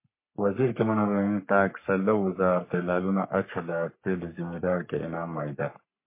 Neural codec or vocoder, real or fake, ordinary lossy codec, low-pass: codec, 44.1 kHz, 3.4 kbps, Pupu-Codec; fake; MP3, 24 kbps; 3.6 kHz